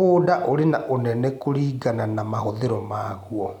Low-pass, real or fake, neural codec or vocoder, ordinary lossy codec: 19.8 kHz; real; none; none